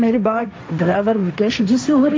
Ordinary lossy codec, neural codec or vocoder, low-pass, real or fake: none; codec, 16 kHz, 1.1 kbps, Voila-Tokenizer; none; fake